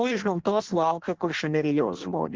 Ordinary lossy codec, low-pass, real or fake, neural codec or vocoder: Opus, 16 kbps; 7.2 kHz; fake; codec, 16 kHz in and 24 kHz out, 0.6 kbps, FireRedTTS-2 codec